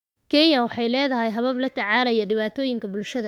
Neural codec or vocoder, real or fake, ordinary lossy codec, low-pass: autoencoder, 48 kHz, 32 numbers a frame, DAC-VAE, trained on Japanese speech; fake; none; 19.8 kHz